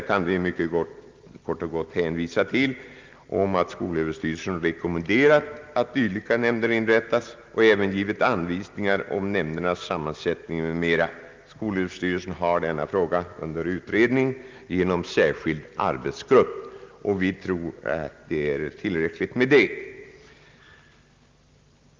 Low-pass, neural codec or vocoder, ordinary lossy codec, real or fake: 7.2 kHz; none; Opus, 24 kbps; real